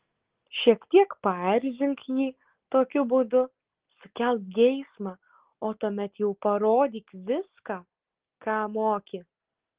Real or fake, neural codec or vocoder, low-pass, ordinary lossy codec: real; none; 3.6 kHz; Opus, 16 kbps